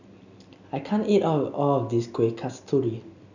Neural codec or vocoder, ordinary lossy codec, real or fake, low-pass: none; none; real; 7.2 kHz